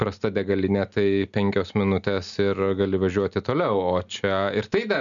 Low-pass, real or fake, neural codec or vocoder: 7.2 kHz; real; none